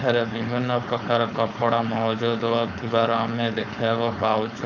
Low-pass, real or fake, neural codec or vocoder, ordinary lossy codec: 7.2 kHz; fake; codec, 16 kHz, 4.8 kbps, FACodec; none